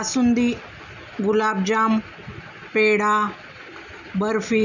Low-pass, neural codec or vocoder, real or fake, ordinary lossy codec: 7.2 kHz; none; real; none